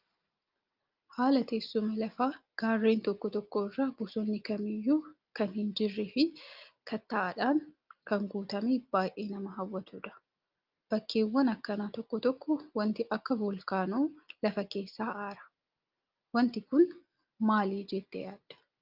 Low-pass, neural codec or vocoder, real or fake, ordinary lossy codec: 5.4 kHz; none; real; Opus, 24 kbps